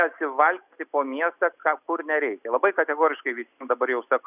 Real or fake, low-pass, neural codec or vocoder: real; 3.6 kHz; none